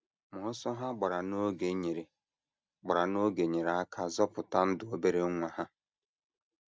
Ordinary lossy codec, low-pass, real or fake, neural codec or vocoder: none; none; real; none